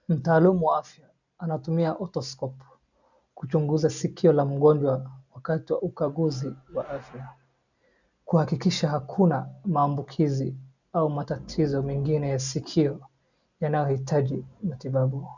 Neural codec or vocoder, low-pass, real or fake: none; 7.2 kHz; real